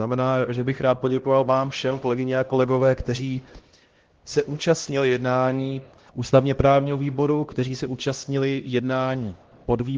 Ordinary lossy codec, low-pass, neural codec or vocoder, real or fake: Opus, 16 kbps; 7.2 kHz; codec, 16 kHz, 1 kbps, X-Codec, HuBERT features, trained on LibriSpeech; fake